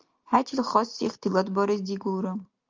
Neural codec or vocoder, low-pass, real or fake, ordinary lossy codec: none; 7.2 kHz; real; Opus, 32 kbps